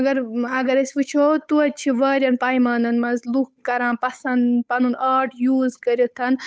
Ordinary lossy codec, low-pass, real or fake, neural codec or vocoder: none; none; fake; codec, 16 kHz, 8 kbps, FunCodec, trained on Chinese and English, 25 frames a second